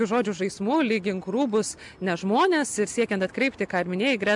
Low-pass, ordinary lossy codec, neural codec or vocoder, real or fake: 10.8 kHz; MP3, 96 kbps; none; real